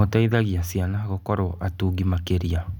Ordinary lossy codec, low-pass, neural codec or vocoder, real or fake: none; 19.8 kHz; none; real